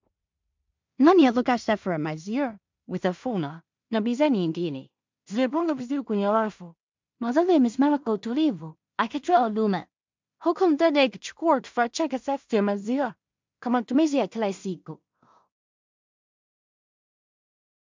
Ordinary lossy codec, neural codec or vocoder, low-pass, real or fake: MP3, 64 kbps; codec, 16 kHz in and 24 kHz out, 0.4 kbps, LongCat-Audio-Codec, two codebook decoder; 7.2 kHz; fake